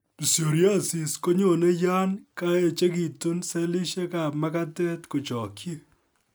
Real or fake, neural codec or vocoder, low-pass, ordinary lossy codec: real; none; none; none